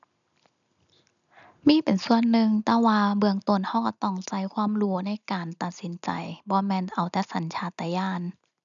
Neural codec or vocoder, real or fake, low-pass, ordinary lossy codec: none; real; 7.2 kHz; none